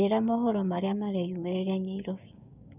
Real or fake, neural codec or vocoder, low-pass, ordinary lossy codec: fake; codec, 16 kHz, 6 kbps, DAC; 3.6 kHz; none